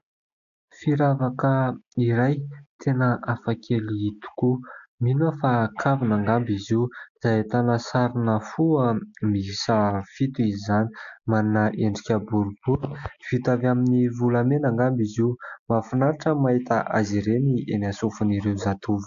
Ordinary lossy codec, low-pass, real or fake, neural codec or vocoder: AAC, 64 kbps; 7.2 kHz; real; none